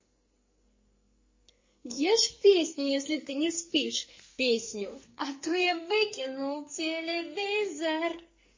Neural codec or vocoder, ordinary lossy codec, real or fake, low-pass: codec, 44.1 kHz, 2.6 kbps, SNAC; MP3, 32 kbps; fake; 7.2 kHz